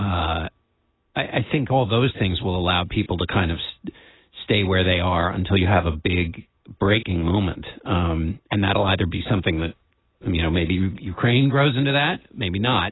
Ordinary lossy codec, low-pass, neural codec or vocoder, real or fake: AAC, 16 kbps; 7.2 kHz; none; real